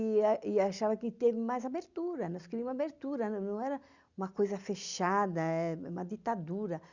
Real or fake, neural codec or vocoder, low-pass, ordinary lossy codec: real; none; 7.2 kHz; Opus, 64 kbps